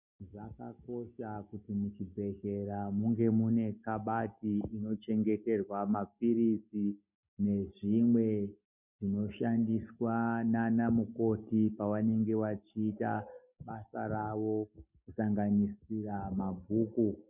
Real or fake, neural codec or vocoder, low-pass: real; none; 3.6 kHz